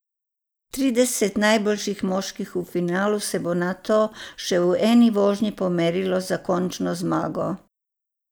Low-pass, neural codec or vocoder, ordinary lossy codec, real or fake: none; none; none; real